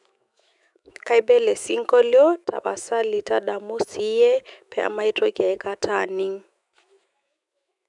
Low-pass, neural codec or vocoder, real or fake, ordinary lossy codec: 10.8 kHz; autoencoder, 48 kHz, 128 numbers a frame, DAC-VAE, trained on Japanese speech; fake; none